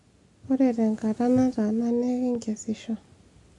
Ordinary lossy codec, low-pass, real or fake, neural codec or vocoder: none; 10.8 kHz; real; none